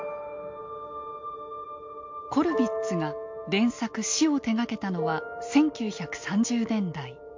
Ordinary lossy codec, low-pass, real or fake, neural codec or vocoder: MP3, 48 kbps; 7.2 kHz; real; none